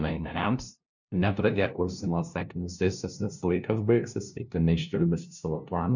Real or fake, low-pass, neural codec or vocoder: fake; 7.2 kHz; codec, 16 kHz, 0.5 kbps, FunCodec, trained on LibriTTS, 25 frames a second